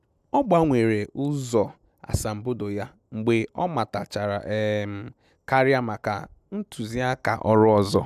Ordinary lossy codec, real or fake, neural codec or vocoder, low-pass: none; real; none; 14.4 kHz